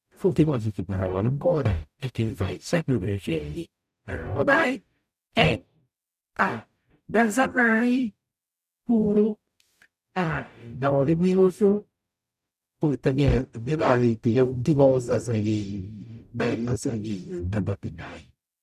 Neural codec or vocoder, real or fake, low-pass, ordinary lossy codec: codec, 44.1 kHz, 0.9 kbps, DAC; fake; 14.4 kHz; none